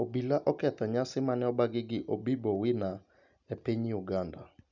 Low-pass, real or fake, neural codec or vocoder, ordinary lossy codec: 7.2 kHz; real; none; none